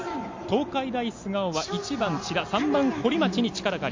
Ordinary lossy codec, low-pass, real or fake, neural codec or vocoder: MP3, 48 kbps; 7.2 kHz; real; none